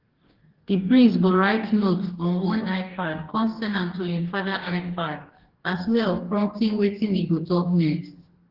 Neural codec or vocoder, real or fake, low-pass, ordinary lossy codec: codec, 44.1 kHz, 2.6 kbps, DAC; fake; 5.4 kHz; Opus, 16 kbps